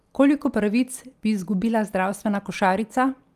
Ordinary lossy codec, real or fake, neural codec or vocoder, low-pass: Opus, 32 kbps; real; none; 14.4 kHz